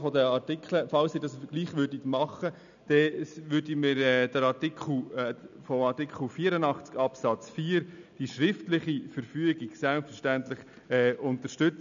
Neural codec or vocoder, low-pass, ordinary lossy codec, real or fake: none; 7.2 kHz; none; real